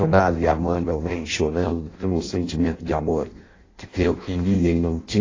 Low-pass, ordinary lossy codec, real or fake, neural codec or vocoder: 7.2 kHz; AAC, 32 kbps; fake; codec, 16 kHz in and 24 kHz out, 0.6 kbps, FireRedTTS-2 codec